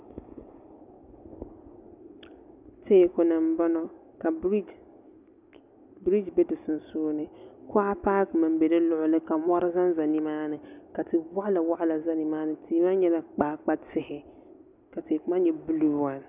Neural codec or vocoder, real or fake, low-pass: none; real; 3.6 kHz